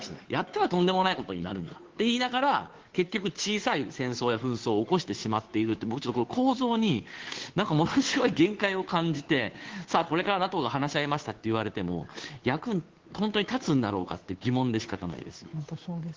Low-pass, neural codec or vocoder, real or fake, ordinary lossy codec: 7.2 kHz; codec, 16 kHz, 2 kbps, FunCodec, trained on Chinese and English, 25 frames a second; fake; Opus, 16 kbps